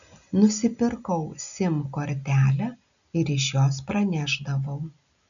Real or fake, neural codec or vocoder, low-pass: real; none; 7.2 kHz